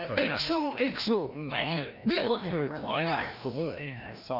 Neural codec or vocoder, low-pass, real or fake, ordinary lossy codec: codec, 16 kHz, 1 kbps, FreqCodec, larger model; 5.4 kHz; fake; none